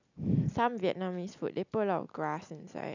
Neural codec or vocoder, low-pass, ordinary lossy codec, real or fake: none; 7.2 kHz; none; real